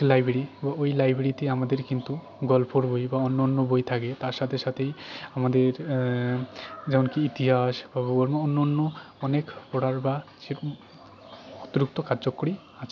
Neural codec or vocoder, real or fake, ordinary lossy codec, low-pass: none; real; none; none